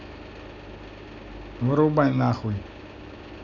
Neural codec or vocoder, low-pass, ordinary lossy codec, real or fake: none; 7.2 kHz; none; real